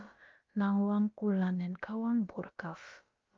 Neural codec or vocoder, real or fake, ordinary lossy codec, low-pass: codec, 16 kHz, about 1 kbps, DyCAST, with the encoder's durations; fake; Opus, 32 kbps; 7.2 kHz